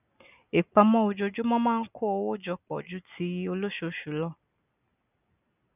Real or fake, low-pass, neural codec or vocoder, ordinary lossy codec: real; 3.6 kHz; none; none